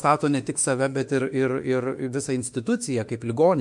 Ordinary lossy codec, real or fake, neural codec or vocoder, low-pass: MP3, 64 kbps; fake; autoencoder, 48 kHz, 32 numbers a frame, DAC-VAE, trained on Japanese speech; 10.8 kHz